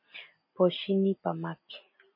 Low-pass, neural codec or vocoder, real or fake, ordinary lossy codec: 5.4 kHz; none; real; MP3, 24 kbps